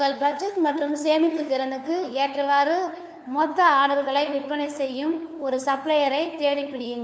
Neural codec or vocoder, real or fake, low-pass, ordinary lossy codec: codec, 16 kHz, 2 kbps, FunCodec, trained on LibriTTS, 25 frames a second; fake; none; none